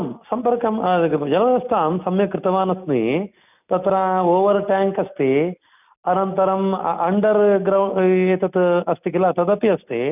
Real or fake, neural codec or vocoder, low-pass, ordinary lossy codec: real; none; 3.6 kHz; none